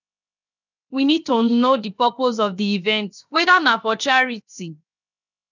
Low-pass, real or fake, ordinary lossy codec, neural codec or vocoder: 7.2 kHz; fake; none; codec, 16 kHz, 0.7 kbps, FocalCodec